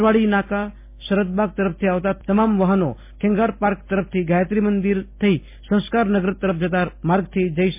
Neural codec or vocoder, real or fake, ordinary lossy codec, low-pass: none; real; MP3, 24 kbps; 3.6 kHz